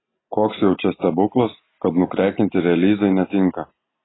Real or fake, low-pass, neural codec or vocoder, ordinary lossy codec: real; 7.2 kHz; none; AAC, 16 kbps